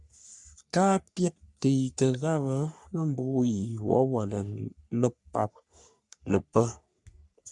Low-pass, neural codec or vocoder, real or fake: 10.8 kHz; codec, 44.1 kHz, 3.4 kbps, Pupu-Codec; fake